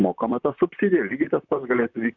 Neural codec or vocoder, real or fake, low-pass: vocoder, 44.1 kHz, 128 mel bands, Pupu-Vocoder; fake; 7.2 kHz